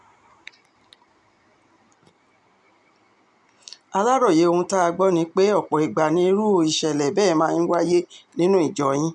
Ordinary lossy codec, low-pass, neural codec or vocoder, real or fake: none; 10.8 kHz; none; real